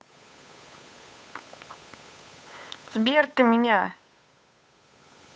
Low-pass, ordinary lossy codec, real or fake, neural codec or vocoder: none; none; fake; codec, 16 kHz, 8 kbps, FunCodec, trained on Chinese and English, 25 frames a second